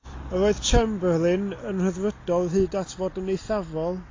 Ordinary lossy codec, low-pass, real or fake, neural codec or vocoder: AAC, 32 kbps; 7.2 kHz; real; none